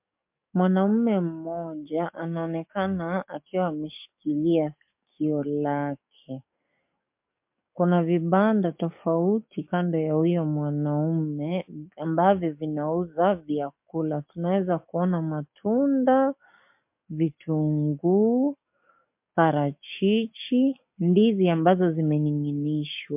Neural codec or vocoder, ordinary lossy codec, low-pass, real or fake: codec, 44.1 kHz, 7.8 kbps, DAC; MP3, 32 kbps; 3.6 kHz; fake